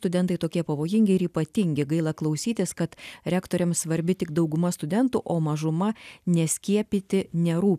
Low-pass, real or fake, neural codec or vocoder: 14.4 kHz; real; none